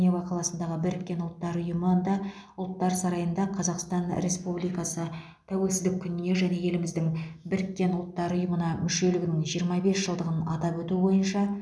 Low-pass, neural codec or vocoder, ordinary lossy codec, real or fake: none; none; none; real